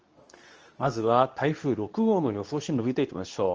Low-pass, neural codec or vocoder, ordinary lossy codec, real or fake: 7.2 kHz; codec, 24 kHz, 0.9 kbps, WavTokenizer, medium speech release version 2; Opus, 24 kbps; fake